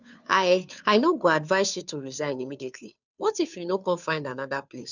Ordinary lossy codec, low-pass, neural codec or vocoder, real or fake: none; 7.2 kHz; codec, 16 kHz, 2 kbps, FunCodec, trained on Chinese and English, 25 frames a second; fake